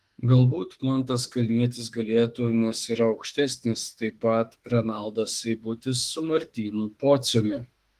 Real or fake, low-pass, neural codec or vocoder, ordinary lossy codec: fake; 14.4 kHz; autoencoder, 48 kHz, 32 numbers a frame, DAC-VAE, trained on Japanese speech; Opus, 24 kbps